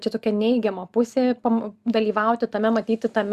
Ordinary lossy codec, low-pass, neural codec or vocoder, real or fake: Opus, 64 kbps; 14.4 kHz; none; real